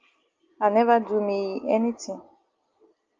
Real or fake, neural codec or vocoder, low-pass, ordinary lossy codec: real; none; 7.2 kHz; Opus, 24 kbps